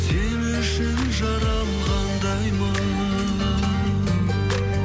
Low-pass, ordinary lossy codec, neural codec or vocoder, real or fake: none; none; none; real